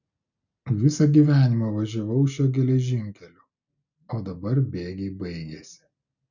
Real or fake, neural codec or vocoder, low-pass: real; none; 7.2 kHz